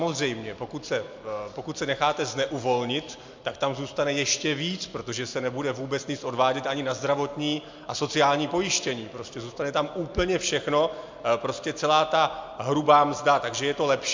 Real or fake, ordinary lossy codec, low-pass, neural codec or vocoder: real; MP3, 64 kbps; 7.2 kHz; none